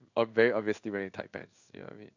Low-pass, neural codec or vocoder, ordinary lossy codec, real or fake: 7.2 kHz; codec, 16 kHz in and 24 kHz out, 1 kbps, XY-Tokenizer; none; fake